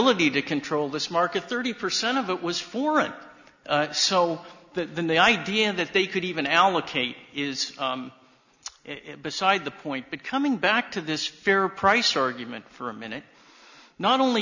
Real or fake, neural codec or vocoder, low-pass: real; none; 7.2 kHz